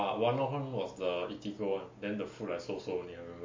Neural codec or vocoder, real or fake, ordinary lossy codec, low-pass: none; real; MP3, 32 kbps; 7.2 kHz